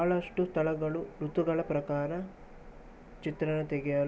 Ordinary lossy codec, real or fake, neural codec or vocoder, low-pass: none; real; none; none